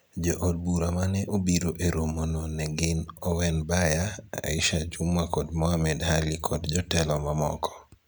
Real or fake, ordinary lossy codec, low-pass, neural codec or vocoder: real; none; none; none